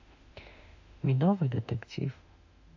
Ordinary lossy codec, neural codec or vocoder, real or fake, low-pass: AAC, 48 kbps; autoencoder, 48 kHz, 32 numbers a frame, DAC-VAE, trained on Japanese speech; fake; 7.2 kHz